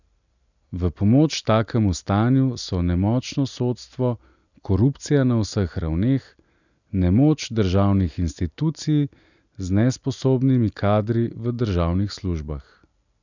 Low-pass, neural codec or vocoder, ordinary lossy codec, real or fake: 7.2 kHz; none; none; real